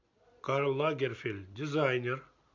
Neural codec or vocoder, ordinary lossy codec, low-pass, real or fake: none; MP3, 48 kbps; 7.2 kHz; real